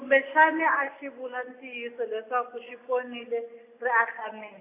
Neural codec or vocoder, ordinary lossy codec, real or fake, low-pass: none; AAC, 24 kbps; real; 3.6 kHz